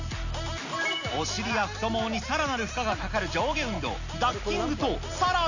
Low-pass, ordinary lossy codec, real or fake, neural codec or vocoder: 7.2 kHz; none; real; none